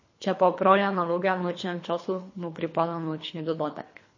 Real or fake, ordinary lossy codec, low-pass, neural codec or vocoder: fake; MP3, 32 kbps; 7.2 kHz; codec, 24 kHz, 3 kbps, HILCodec